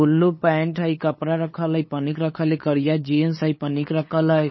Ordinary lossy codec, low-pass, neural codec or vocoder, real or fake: MP3, 24 kbps; 7.2 kHz; codec, 16 kHz, 8 kbps, FunCodec, trained on LibriTTS, 25 frames a second; fake